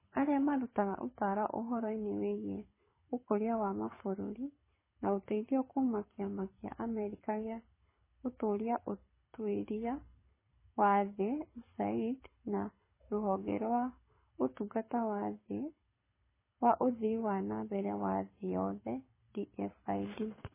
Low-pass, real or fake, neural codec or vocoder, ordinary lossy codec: 3.6 kHz; fake; codec, 24 kHz, 6 kbps, HILCodec; MP3, 16 kbps